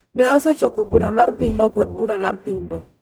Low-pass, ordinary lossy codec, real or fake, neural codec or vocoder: none; none; fake; codec, 44.1 kHz, 0.9 kbps, DAC